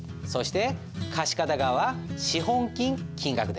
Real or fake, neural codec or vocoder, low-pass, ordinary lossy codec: real; none; none; none